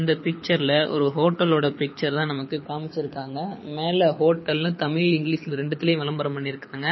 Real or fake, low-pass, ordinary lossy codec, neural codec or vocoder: fake; 7.2 kHz; MP3, 24 kbps; codec, 24 kHz, 6 kbps, HILCodec